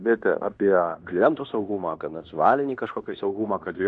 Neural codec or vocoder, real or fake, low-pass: codec, 16 kHz in and 24 kHz out, 0.9 kbps, LongCat-Audio-Codec, fine tuned four codebook decoder; fake; 10.8 kHz